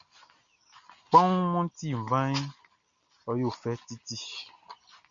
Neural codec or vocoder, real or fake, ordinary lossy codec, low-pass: none; real; MP3, 64 kbps; 7.2 kHz